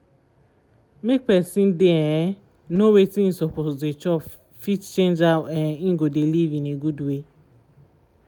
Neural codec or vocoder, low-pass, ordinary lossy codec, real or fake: none; none; none; real